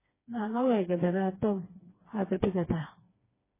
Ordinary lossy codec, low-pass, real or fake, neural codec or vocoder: MP3, 16 kbps; 3.6 kHz; fake; codec, 16 kHz, 2 kbps, FreqCodec, smaller model